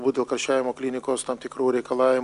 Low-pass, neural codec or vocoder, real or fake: 10.8 kHz; none; real